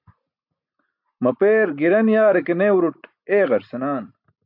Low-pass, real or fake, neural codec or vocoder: 5.4 kHz; real; none